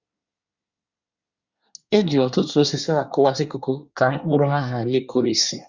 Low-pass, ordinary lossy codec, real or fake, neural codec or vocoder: 7.2 kHz; Opus, 64 kbps; fake; codec, 24 kHz, 1 kbps, SNAC